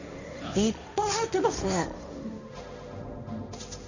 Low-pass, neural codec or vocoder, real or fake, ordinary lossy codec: 7.2 kHz; codec, 16 kHz, 1.1 kbps, Voila-Tokenizer; fake; none